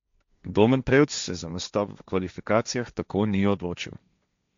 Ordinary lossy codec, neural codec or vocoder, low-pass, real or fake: MP3, 64 kbps; codec, 16 kHz, 1.1 kbps, Voila-Tokenizer; 7.2 kHz; fake